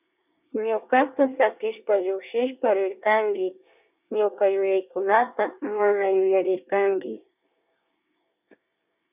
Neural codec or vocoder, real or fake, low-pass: codec, 24 kHz, 1 kbps, SNAC; fake; 3.6 kHz